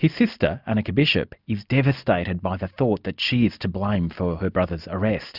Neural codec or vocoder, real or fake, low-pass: none; real; 5.4 kHz